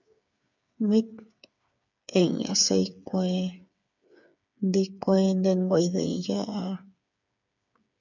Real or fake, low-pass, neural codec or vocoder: fake; 7.2 kHz; codec, 16 kHz, 8 kbps, FreqCodec, smaller model